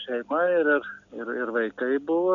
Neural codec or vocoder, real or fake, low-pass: none; real; 7.2 kHz